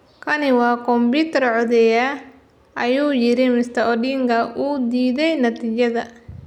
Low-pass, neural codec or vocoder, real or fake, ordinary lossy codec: 19.8 kHz; none; real; none